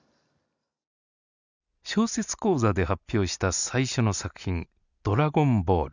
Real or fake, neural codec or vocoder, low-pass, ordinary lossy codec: real; none; 7.2 kHz; none